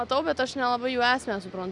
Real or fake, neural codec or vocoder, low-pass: real; none; 10.8 kHz